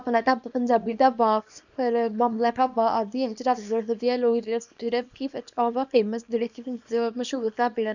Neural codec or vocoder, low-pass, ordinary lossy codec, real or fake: codec, 24 kHz, 0.9 kbps, WavTokenizer, small release; 7.2 kHz; none; fake